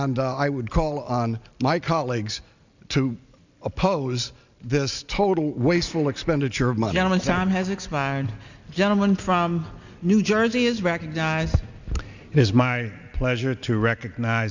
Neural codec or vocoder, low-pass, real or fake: none; 7.2 kHz; real